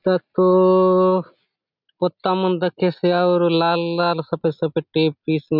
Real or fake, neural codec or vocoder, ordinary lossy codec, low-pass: real; none; none; 5.4 kHz